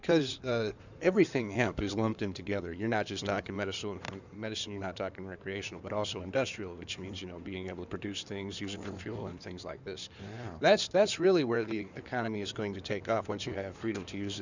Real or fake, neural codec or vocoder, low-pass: fake; codec, 16 kHz in and 24 kHz out, 2.2 kbps, FireRedTTS-2 codec; 7.2 kHz